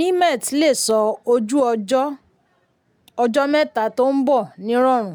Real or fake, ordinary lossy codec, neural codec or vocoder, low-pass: real; none; none; none